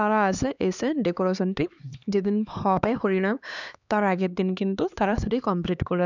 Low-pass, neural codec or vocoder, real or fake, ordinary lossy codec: 7.2 kHz; codec, 16 kHz, 2 kbps, X-Codec, HuBERT features, trained on LibriSpeech; fake; none